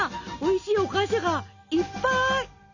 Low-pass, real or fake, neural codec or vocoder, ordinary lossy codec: 7.2 kHz; real; none; none